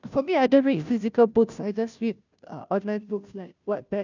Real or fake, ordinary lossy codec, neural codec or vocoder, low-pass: fake; none; codec, 16 kHz, 0.5 kbps, FunCodec, trained on Chinese and English, 25 frames a second; 7.2 kHz